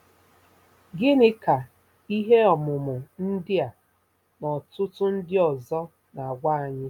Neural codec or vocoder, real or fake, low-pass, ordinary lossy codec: none; real; 19.8 kHz; none